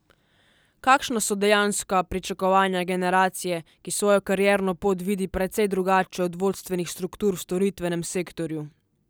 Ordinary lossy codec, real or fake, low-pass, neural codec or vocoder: none; real; none; none